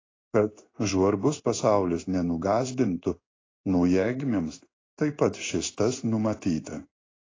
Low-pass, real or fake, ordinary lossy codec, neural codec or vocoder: 7.2 kHz; fake; AAC, 32 kbps; codec, 16 kHz in and 24 kHz out, 1 kbps, XY-Tokenizer